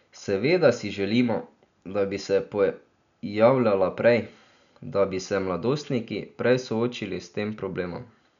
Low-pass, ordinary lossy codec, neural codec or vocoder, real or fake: 7.2 kHz; none; none; real